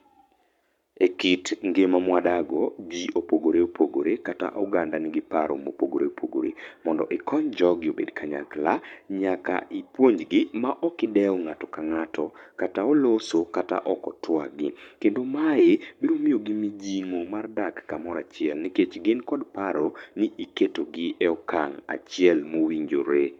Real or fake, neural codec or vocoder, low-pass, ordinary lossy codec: fake; codec, 44.1 kHz, 7.8 kbps, Pupu-Codec; 19.8 kHz; none